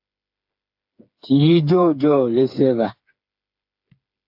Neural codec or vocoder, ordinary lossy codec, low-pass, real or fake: codec, 16 kHz, 4 kbps, FreqCodec, smaller model; AAC, 48 kbps; 5.4 kHz; fake